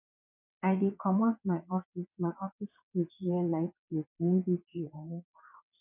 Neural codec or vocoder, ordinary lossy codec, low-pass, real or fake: vocoder, 22.05 kHz, 80 mel bands, WaveNeXt; none; 3.6 kHz; fake